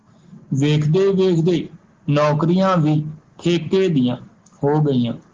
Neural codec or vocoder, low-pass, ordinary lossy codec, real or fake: none; 7.2 kHz; Opus, 16 kbps; real